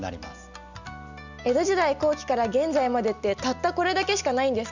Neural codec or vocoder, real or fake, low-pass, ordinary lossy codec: none; real; 7.2 kHz; none